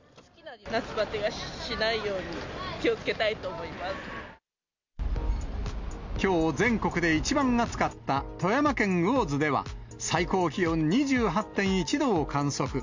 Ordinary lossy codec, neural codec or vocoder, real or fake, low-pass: MP3, 64 kbps; none; real; 7.2 kHz